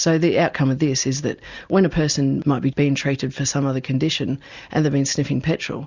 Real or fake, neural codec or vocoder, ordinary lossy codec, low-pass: real; none; Opus, 64 kbps; 7.2 kHz